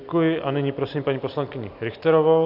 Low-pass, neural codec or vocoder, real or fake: 5.4 kHz; none; real